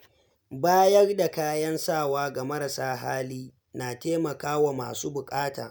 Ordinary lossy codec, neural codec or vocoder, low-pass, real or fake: none; none; none; real